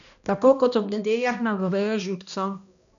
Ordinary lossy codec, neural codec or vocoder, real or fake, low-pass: none; codec, 16 kHz, 1 kbps, X-Codec, HuBERT features, trained on balanced general audio; fake; 7.2 kHz